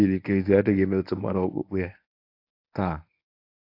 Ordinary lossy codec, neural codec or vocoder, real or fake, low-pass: AAC, 32 kbps; codec, 24 kHz, 0.9 kbps, WavTokenizer, medium speech release version 1; fake; 5.4 kHz